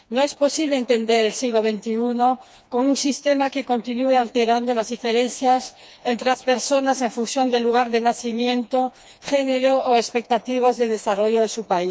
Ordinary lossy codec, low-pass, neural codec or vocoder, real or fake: none; none; codec, 16 kHz, 2 kbps, FreqCodec, smaller model; fake